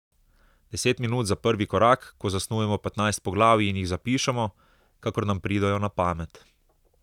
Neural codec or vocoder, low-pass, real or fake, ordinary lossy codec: none; 19.8 kHz; real; none